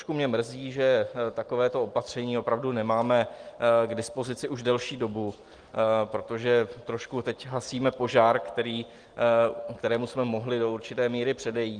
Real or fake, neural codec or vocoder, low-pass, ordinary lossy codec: real; none; 9.9 kHz; Opus, 24 kbps